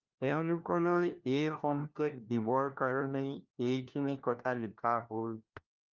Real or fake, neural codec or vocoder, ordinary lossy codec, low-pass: fake; codec, 16 kHz, 1 kbps, FunCodec, trained on LibriTTS, 50 frames a second; Opus, 32 kbps; 7.2 kHz